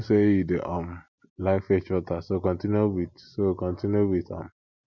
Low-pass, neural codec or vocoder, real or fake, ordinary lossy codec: 7.2 kHz; none; real; none